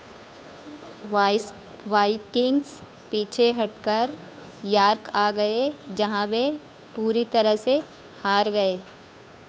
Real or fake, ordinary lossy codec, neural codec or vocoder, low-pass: fake; none; codec, 16 kHz, 2 kbps, FunCodec, trained on Chinese and English, 25 frames a second; none